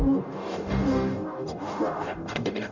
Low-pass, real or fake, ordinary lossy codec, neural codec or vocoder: 7.2 kHz; fake; none; codec, 44.1 kHz, 0.9 kbps, DAC